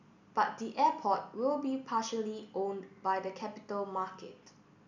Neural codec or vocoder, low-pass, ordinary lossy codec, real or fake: none; 7.2 kHz; none; real